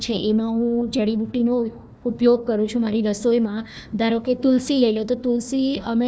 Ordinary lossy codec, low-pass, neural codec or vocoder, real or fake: none; none; codec, 16 kHz, 1 kbps, FunCodec, trained on Chinese and English, 50 frames a second; fake